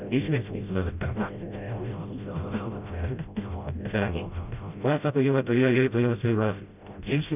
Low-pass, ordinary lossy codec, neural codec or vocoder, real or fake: 3.6 kHz; none; codec, 16 kHz, 0.5 kbps, FreqCodec, smaller model; fake